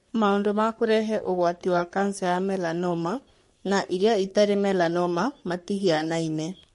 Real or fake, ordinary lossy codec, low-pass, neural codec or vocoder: fake; MP3, 48 kbps; 14.4 kHz; codec, 44.1 kHz, 3.4 kbps, Pupu-Codec